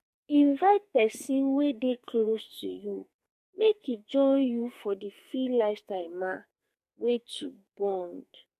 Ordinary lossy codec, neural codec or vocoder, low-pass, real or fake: MP3, 64 kbps; codec, 44.1 kHz, 2.6 kbps, SNAC; 14.4 kHz; fake